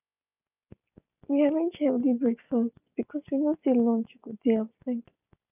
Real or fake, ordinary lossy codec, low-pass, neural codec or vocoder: real; none; 3.6 kHz; none